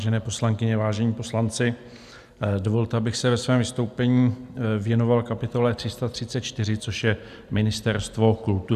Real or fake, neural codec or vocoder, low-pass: real; none; 14.4 kHz